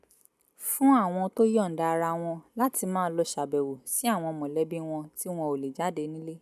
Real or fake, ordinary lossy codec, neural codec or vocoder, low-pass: real; none; none; 14.4 kHz